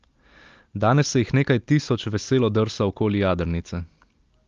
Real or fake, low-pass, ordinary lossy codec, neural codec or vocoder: real; 7.2 kHz; Opus, 32 kbps; none